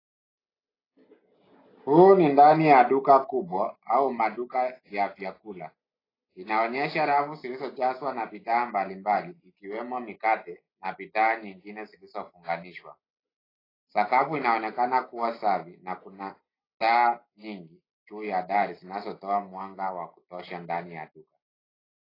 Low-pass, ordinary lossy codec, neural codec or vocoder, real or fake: 5.4 kHz; AAC, 24 kbps; none; real